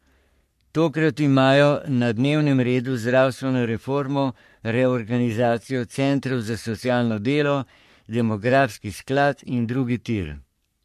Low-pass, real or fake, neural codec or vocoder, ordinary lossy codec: 14.4 kHz; fake; codec, 44.1 kHz, 3.4 kbps, Pupu-Codec; MP3, 64 kbps